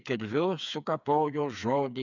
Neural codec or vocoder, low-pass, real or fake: codec, 16 kHz, 4 kbps, FreqCodec, smaller model; 7.2 kHz; fake